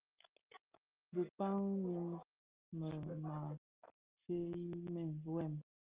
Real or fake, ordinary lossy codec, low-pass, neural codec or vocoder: real; Opus, 24 kbps; 3.6 kHz; none